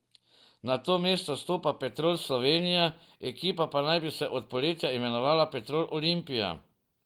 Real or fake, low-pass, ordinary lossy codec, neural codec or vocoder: real; 19.8 kHz; Opus, 24 kbps; none